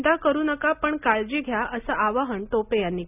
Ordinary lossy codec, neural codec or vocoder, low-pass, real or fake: none; none; 3.6 kHz; real